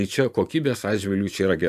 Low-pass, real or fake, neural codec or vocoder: 14.4 kHz; fake; vocoder, 44.1 kHz, 128 mel bands every 512 samples, BigVGAN v2